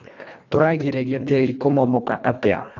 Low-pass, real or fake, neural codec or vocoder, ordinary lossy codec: 7.2 kHz; fake; codec, 24 kHz, 1.5 kbps, HILCodec; none